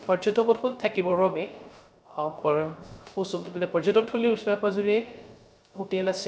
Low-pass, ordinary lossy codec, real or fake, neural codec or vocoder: none; none; fake; codec, 16 kHz, 0.3 kbps, FocalCodec